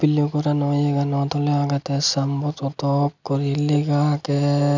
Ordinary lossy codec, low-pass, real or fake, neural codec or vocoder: none; 7.2 kHz; real; none